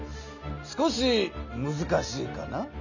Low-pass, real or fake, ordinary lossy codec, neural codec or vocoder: 7.2 kHz; real; none; none